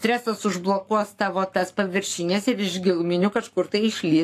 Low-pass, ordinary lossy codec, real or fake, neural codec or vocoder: 14.4 kHz; AAC, 64 kbps; fake; codec, 44.1 kHz, 7.8 kbps, Pupu-Codec